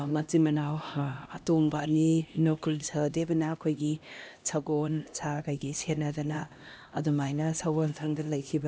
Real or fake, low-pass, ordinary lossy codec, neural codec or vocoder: fake; none; none; codec, 16 kHz, 1 kbps, X-Codec, HuBERT features, trained on LibriSpeech